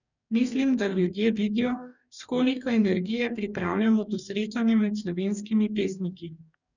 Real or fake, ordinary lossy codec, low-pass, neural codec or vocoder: fake; none; 7.2 kHz; codec, 44.1 kHz, 2.6 kbps, DAC